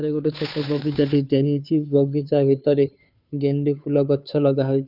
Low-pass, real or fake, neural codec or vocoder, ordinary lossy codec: 5.4 kHz; fake; codec, 16 kHz, 2 kbps, FunCodec, trained on Chinese and English, 25 frames a second; none